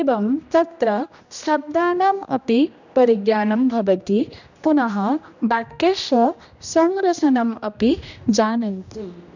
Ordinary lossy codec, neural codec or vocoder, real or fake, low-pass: none; codec, 16 kHz, 1 kbps, X-Codec, HuBERT features, trained on general audio; fake; 7.2 kHz